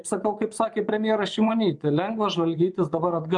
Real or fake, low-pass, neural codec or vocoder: fake; 10.8 kHz; vocoder, 24 kHz, 100 mel bands, Vocos